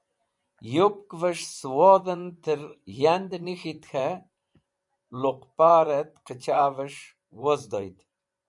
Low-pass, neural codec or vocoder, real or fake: 10.8 kHz; vocoder, 24 kHz, 100 mel bands, Vocos; fake